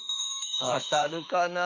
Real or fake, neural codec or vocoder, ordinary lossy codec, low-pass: fake; autoencoder, 48 kHz, 32 numbers a frame, DAC-VAE, trained on Japanese speech; none; 7.2 kHz